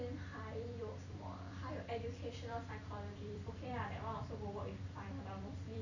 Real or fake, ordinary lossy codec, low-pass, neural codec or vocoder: fake; none; 7.2 kHz; vocoder, 44.1 kHz, 128 mel bands every 256 samples, BigVGAN v2